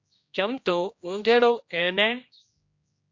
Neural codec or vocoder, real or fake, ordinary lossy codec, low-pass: codec, 16 kHz, 1 kbps, X-Codec, HuBERT features, trained on general audio; fake; MP3, 48 kbps; 7.2 kHz